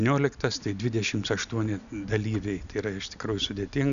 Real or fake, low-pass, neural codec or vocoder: real; 7.2 kHz; none